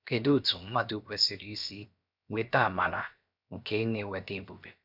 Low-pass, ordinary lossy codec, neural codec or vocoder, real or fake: 5.4 kHz; none; codec, 16 kHz, 0.7 kbps, FocalCodec; fake